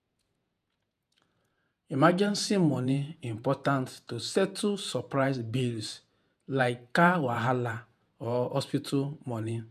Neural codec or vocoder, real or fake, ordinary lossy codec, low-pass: vocoder, 48 kHz, 128 mel bands, Vocos; fake; none; 14.4 kHz